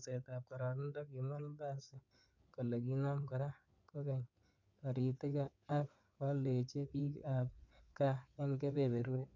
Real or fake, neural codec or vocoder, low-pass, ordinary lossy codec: fake; codec, 16 kHz in and 24 kHz out, 2.2 kbps, FireRedTTS-2 codec; 7.2 kHz; none